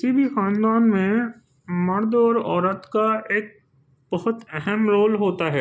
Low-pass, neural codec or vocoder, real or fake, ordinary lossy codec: none; none; real; none